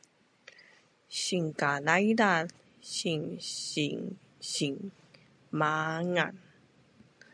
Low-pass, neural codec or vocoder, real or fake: 9.9 kHz; none; real